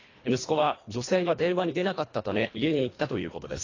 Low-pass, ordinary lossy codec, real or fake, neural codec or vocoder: 7.2 kHz; AAC, 32 kbps; fake; codec, 24 kHz, 1.5 kbps, HILCodec